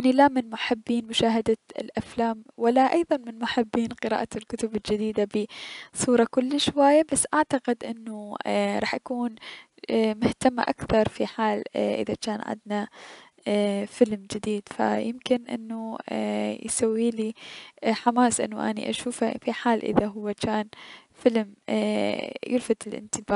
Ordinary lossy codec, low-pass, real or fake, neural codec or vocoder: none; 10.8 kHz; real; none